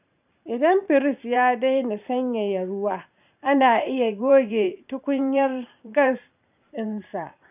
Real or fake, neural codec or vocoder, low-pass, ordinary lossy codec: fake; vocoder, 44.1 kHz, 128 mel bands every 512 samples, BigVGAN v2; 3.6 kHz; none